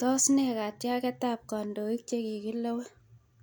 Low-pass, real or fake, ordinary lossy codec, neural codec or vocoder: none; real; none; none